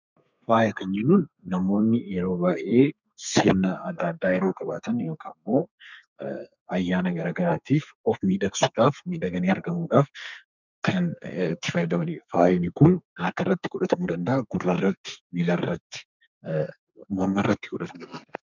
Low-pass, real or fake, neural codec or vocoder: 7.2 kHz; fake; codec, 32 kHz, 1.9 kbps, SNAC